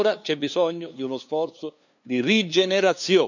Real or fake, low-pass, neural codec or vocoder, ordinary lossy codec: fake; 7.2 kHz; codec, 16 kHz, 2 kbps, FunCodec, trained on LibriTTS, 25 frames a second; none